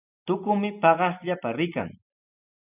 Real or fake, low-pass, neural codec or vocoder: real; 3.6 kHz; none